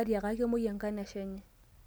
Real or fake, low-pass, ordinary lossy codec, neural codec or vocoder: real; none; none; none